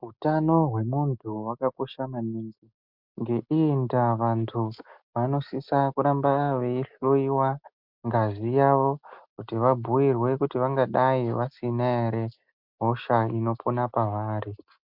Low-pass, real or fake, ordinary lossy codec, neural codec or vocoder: 5.4 kHz; real; MP3, 48 kbps; none